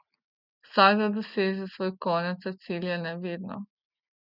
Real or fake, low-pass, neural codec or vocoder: real; 5.4 kHz; none